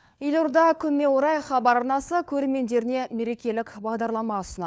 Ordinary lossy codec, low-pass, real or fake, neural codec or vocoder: none; none; fake; codec, 16 kHz, 4 kbps, FunCodec, trained on LibriTTS, 50 frames a second